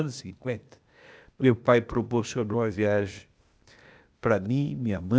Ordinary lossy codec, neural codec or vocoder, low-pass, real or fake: none; codec, 16 kHz, 0.8 kbps, ZipCodec; none; fake